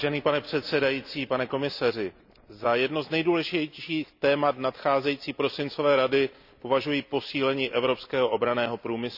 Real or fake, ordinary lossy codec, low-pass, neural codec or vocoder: real; none; 5.4 kHz; none